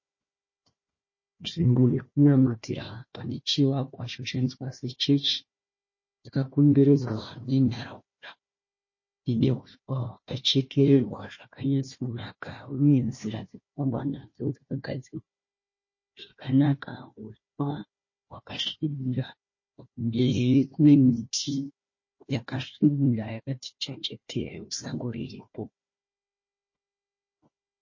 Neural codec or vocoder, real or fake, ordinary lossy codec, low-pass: codec, 16 kHz, 1 kbps, FunCodec, trained on Chinese and English, 50 frames a second; fake; MP3, 32 kbps; 7.2 kHz